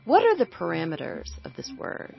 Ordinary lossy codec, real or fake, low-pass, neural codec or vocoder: MP3, 24 kbps; real; 7.2 kHz; none